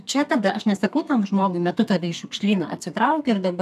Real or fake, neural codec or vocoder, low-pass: fake; codec, 32 kHz, 1.9 kbps, SNAC; 14.4 kHz